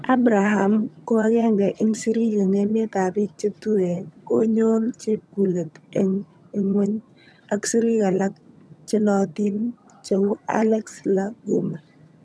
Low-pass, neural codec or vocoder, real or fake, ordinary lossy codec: none; vocoder, 22.05 kHz, 80 mel bands, HiFi-GAN; fake; none